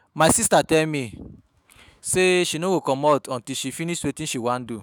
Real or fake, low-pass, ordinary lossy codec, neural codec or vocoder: fake; none; none; autoencoder, 48 kHz, 128 numbers a frame, DAC-VAE, trained on Japanese speech